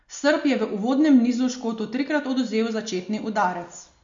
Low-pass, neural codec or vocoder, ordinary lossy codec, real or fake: 7.2 kHz; none; MP3, 48 kbps; real